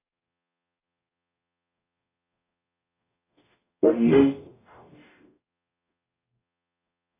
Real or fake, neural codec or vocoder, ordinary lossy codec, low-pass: fake; codec, 44.1 kHz, 0.9 kbps, DAC; AAC, 24 kbps; 3.6 kHz